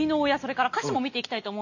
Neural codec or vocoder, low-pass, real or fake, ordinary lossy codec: none; 7.2 kHz; real; none